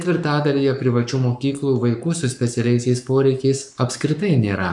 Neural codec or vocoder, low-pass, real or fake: codec, 44.1 kHz, 7.8 kbps, Pupu-Codec; 10.8 kHz; fake